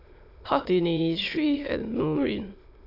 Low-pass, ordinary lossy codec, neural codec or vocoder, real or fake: 5.4 kHz; AAC, 32 kbps; autoencoder, 22.05 kHz, a latent of 192 numbers a frame, VITS, trained on many speakers; fake